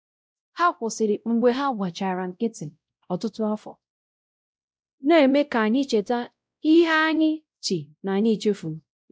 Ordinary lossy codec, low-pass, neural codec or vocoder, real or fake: none; none; codec, 16 kHz, 0.5 kbps, X-Codec, WavLM features, trained on Multilingual LibriSpeech; fake